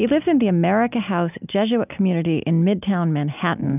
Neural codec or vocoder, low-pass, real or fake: none; 3.6 kHz; real